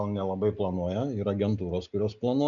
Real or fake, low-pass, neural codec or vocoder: real; 7.2 kHz; none